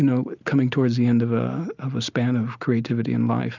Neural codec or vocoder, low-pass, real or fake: none; 7.2 kHz; real